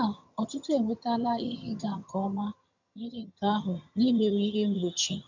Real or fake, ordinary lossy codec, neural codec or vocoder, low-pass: fake; none; vocoder, 22.05 kHz, 80 mel bands, HiFi-GAN; 7.2 kHz